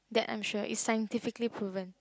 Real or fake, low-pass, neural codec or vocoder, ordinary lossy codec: real; none; none; none